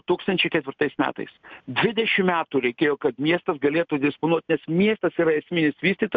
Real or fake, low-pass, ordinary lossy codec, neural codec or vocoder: real; 7.2 kHz; MP3, 64 kbps; none